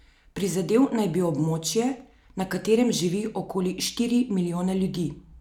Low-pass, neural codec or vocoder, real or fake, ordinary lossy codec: 19.8 kHz; none; real; none